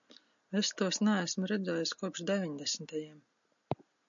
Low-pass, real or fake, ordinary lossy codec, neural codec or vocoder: 7.2 kHz; real; MP3, 96 kbps; none